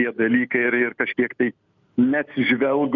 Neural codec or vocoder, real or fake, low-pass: none; real; 7.2 kHz